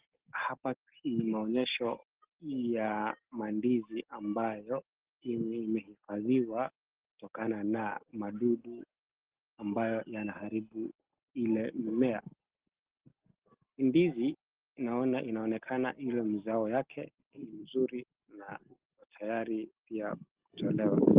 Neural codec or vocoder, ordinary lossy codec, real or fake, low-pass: none; Opus, 16 kbps; real; 3.6 kHz